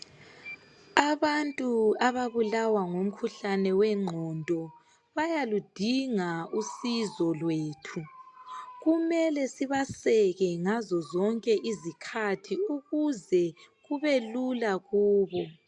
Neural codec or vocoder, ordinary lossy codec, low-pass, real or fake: none; AAC, 64 kbps; 10.8 kHz; real